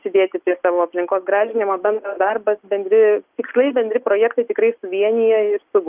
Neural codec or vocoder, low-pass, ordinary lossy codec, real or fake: none; 3.6 kHz; Opus, 24 kbps; real